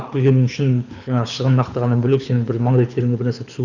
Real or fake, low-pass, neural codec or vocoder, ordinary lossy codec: fake; 7.2 kHz; codec, 24 kHz, 6 kbps, HILCodec; none